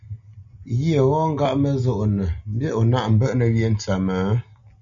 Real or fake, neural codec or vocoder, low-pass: real; none; 7.2 kHz